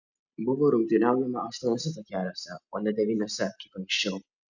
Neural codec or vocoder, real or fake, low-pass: none; real; 7.2 kHz